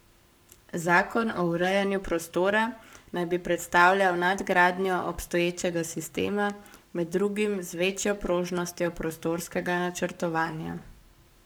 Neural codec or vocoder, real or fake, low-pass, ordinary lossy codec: codec, 44.1 kHz, 7.8 kbps, Pupu-Codec; fake; none; none